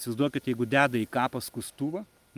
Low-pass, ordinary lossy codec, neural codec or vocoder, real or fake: 14.4 kHz; Opus, 32 kbps; none; real